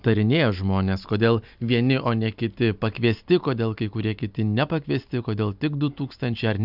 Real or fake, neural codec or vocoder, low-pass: real; none; 5.4 kHz